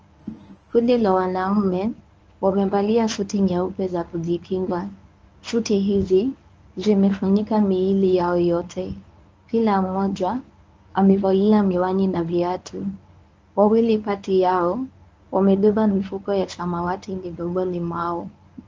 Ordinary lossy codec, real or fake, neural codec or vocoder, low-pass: Opus, 24 kbps; fake; codec, 24 kHz, 0.9 kbps, WavTokenizer, medium speech release version 1; 7.2 kHz